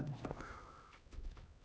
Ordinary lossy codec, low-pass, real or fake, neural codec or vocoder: none; none; fake; codec, 16 kHz, 1 kbps, X-Codec, HuBERT features, trained on LibriSpeech